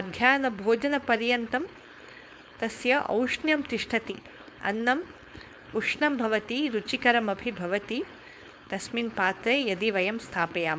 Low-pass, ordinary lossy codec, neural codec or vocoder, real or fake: none; none; codec, 16 kHz, 4.8 kbps, FACodec; fake